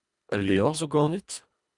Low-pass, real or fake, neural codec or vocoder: 10.8 kHz; fake; codec, 24 kHz, 1.5 kbps, HILCodec